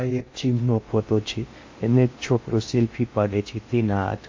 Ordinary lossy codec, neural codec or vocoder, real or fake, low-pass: MP3, 32 kbps; codec, 16 kHz in and 24 kHz out, 0.6 kbps, FocalCodec, streaming, 2048 codes; fake; 7.2 kHz